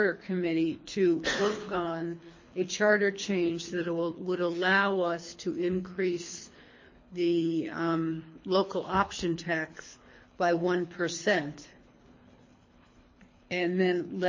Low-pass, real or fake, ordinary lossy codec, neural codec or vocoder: 7.2 kHz; fake; MP3, 32 kbps; codec, 24 kHz, 3 kbps, HILCodec